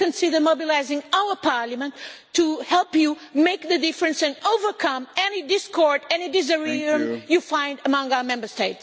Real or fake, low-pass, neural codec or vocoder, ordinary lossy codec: real; none; none; none